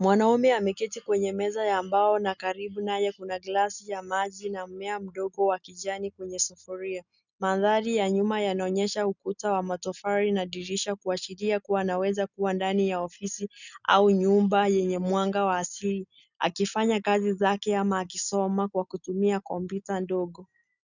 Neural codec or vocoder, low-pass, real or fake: none; 7.2 kHz; real